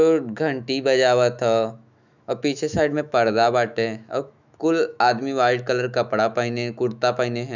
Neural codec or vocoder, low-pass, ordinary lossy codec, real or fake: autoencoder, 48 kHz, 128 numbers a frame, DAC-VAE, trained on Japanese speech; 7.2 kHz; none; fake